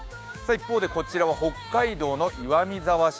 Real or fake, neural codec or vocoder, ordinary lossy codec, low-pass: fake; codec, 16 kHz, 6 kbps, DAC; none; none